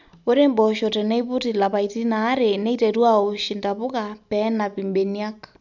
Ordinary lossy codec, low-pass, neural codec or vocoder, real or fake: none; 7.2 kHz; none; real